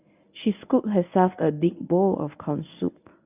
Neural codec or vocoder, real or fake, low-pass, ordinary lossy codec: codec, 24 kHz, 0.9 kbps, WavTokenizer, medium speech release version 1; fake; 3.6 kHz; none